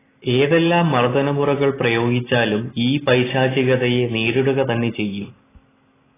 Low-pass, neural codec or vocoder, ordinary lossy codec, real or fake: 3.6 kHz; none; AAC, 16 kbps; real